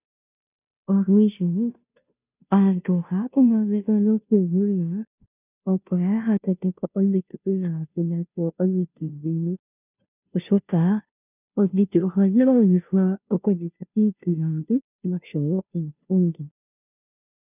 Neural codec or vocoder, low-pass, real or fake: codec, 16 kHz, 0.5 kbps, FunCodec, trained on Chinese and English, 25 frames a second; 3.6 kHz; fake